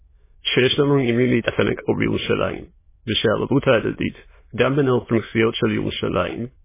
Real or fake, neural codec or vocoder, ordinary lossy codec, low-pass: fake; autoencoder, 22.05 kHz, a latent of 192 numbers a frame, VITS, trained on many speakers; MP3, 16 kbps; 3.6 kHz